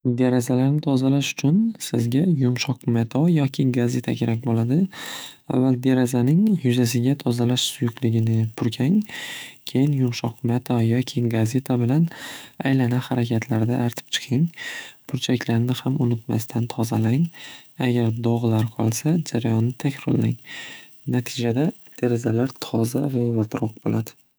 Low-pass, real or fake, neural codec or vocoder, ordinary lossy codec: none; fake; autoencoder, 48 kHz, 128 numbers a frame, DAC-VAE, trained on Japanese speech; none